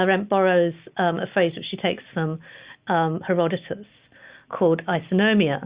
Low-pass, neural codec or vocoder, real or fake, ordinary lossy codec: 3.6 kHz; none; real; Opus, 64 kbps